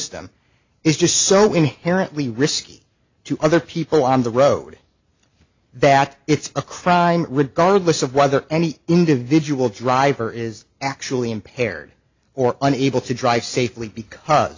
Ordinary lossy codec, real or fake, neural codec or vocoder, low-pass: AAC, 48 kbps; real; none; 7.2 kHz